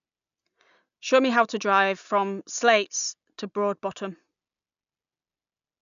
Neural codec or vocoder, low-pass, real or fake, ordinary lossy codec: none; 7.2 kHz; real; none